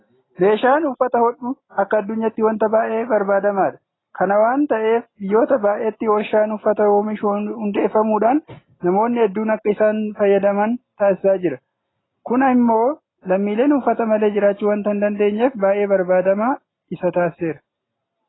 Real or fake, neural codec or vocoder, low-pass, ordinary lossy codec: real; none; 7.2 kHz; AAC, 16 kbps